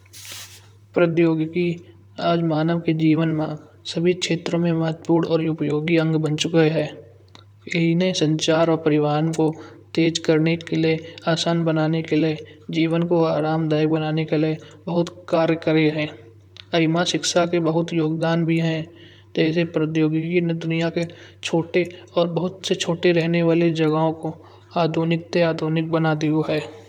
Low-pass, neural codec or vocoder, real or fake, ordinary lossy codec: 19.8 kHz; vocoder, 44.1 kHz, 128 mel bands, Pupu-Vocoder; fake; none